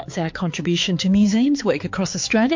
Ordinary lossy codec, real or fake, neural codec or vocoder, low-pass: MP3, 48 kbps; fake; codec, 16 kHz, 4 kbps, X-Codec, HuBERT features, trained on balanced general audio; 7.2 kHz